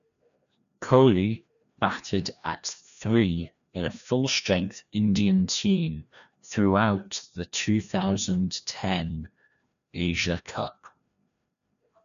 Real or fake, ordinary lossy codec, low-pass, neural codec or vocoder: fake; none; 7.2 kHz; codec, 16 kHz, 1 kbps, FreqCodec, larger model